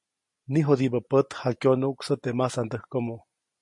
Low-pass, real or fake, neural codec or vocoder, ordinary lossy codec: 10.8 kHz; real; none; MP3, 48 kbps